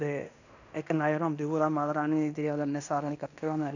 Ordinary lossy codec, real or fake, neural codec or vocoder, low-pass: none; fake; codec, 16 kHz in and 24 kHz out, 0.9 kbps, LongCat-Audio-Codec, fine tuned four codebook decoder; 7.2 kHz